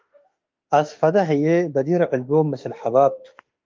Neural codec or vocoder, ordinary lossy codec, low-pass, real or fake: autoencoder, 48 kHz, 32 numbers a frame, DAC-VAE, trained on Japanese speech; Opus, 24 kbps; 7.2 kHz; fake